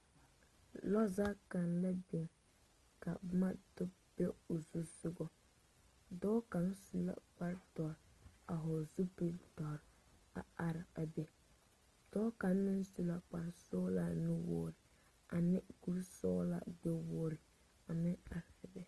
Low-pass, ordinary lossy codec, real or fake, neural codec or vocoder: 10.8 kHz; Opus, 24 kbps; real; none